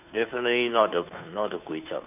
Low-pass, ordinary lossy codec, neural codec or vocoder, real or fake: 3.6 kHz; none; codec, 16 kHz, 2 kbps, FunCodec, trained on Chinese and English, 25 frames a second; fake